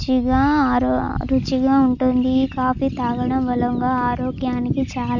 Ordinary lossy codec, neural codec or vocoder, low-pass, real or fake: none; none; 7.2 kHz; real